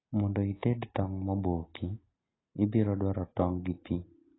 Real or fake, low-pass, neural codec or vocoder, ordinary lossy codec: real; 7.2 kHz; none; AAC, 16 kbps